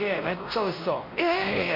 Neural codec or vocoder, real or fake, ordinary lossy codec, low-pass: codec, 16 kHz, 1 kbps, X-Codec, WavLM features, trained on Multilingual LibriSpeech; fake; MP3, 48 kbps; 5.4 kHz